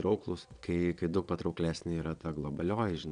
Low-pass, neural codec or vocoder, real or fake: 9.9 kHz; vocoder, 22.05 kHz, 80 mel bands, Vocos; fake